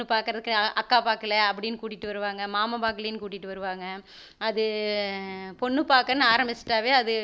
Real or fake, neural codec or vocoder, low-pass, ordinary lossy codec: real; none; none; none